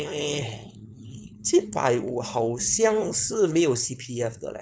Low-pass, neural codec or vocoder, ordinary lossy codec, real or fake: none; codec, 16 kHz, 4.8 kbps, FACodec; none; fake